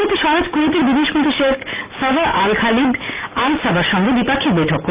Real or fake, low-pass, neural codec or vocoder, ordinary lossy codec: real; 3.6 kHz; none; Opus, 32 kbps